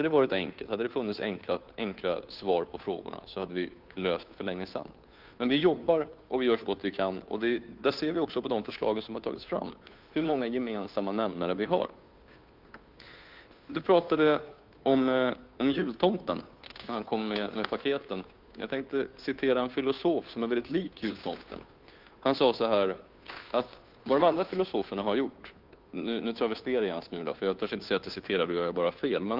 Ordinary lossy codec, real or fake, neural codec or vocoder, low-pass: Opus, 24 kbps; fake; codec, 16 kHz, 2 kbps, FunCodec, trained on Chinese and English, 25 frames a second; 5.4 kHz